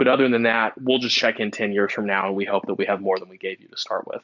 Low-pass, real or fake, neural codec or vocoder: 7.2 kHz; real; none